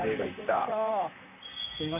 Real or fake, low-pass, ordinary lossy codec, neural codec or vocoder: fake; 3.6 kHz; none; vocoder, 44.1 kHz, 128 mel bands, Pupu-Vocoder